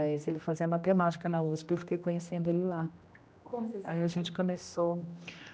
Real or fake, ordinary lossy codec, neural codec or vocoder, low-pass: fake; none; codec, 16 kHz, 1 kbps, X-Codec, HuBERT features, trained on general audio; none